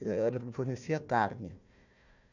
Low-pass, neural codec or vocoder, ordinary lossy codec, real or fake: 7.2 kHz; codec, 16 kHz, 1 kbps, FunCodec, trained on Chinese and English, 50 frames a second; none; fake